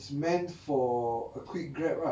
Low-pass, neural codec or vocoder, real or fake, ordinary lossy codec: none; none; real; none